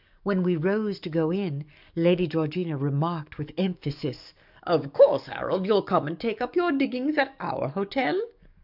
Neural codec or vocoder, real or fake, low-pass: codec, 44.1 kHz, 7.8 kbps, DAC; fake; 5.4 kHz